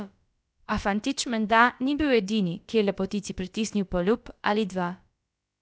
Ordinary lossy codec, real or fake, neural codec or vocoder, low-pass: none; fake; codec, 16 kHz, about 1 kbps, DyCAST, with the encoder's durations; none